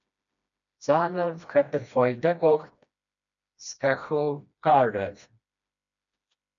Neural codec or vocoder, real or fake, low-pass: codec, 16 kHz, 1 kbps, FreqCodec, smaller model; fake; 7.2 kHz